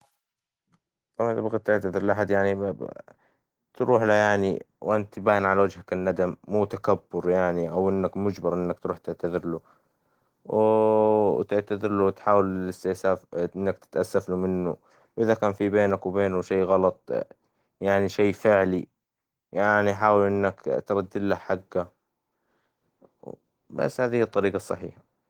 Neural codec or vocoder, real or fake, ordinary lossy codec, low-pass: none; real; Opus, 16 kbps; 19.8 kHz